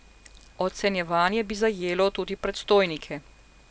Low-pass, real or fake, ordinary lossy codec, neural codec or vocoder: none; real; none; none